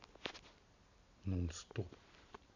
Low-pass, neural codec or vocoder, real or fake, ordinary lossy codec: 7.2 kHz; none; real; none